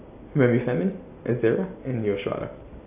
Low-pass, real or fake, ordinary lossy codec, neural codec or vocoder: 3.6 kHz; real; AAC, 24 kbps; none